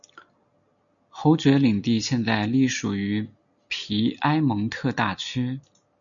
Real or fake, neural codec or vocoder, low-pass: real; none; 7.2 kHz